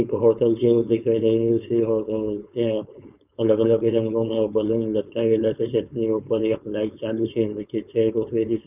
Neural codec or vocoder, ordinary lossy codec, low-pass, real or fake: codec, 16 kHz, 4.8 kbps, FACodec; none; 3.6 kHz; fake